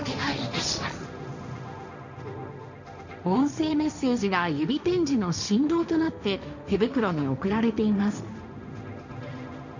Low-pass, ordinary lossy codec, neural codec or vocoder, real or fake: 7.2 kHz; none; codec, 16 kHz, 1.1 kbps, Voila-Tokenizer; fake